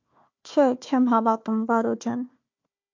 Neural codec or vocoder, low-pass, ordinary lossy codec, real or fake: codec, 16 kHz, 1 kbps, FunCodec, trained on Chinese and English, 50 frames a second; 7.2 kHz; MP3, 48 kbps; fake